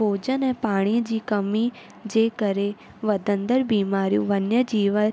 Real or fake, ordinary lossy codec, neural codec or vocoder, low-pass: real; none; none; none